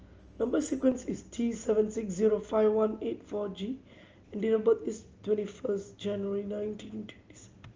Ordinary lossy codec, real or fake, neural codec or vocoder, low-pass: Opus, 24 kbps; real; none; 7.2 kHz